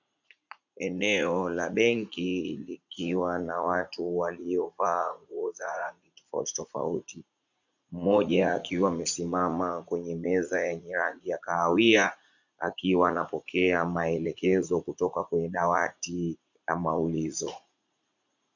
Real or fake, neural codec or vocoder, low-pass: fake; vocoder, 44.1 kHz, 80 mel bands, Vocos; 7.2 kHz